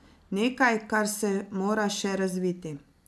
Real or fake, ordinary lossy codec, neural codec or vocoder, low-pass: real; none; none; none